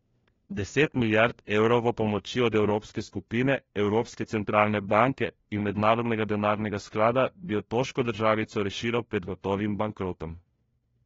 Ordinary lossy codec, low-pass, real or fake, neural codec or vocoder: AAC, 24 kbps; 7.2 kHz; fake; codec, 16 kHz, 1 kbps, FunCodec, trained on LibriTTS, 50 frames a second